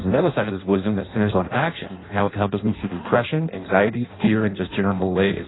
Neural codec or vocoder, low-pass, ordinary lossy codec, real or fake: codec, 16 kHz in and 24 kHz out, 0.6 kbps, FireRedTTS-2 codec; 7.2 kHz; AAC, 16 kbps; fake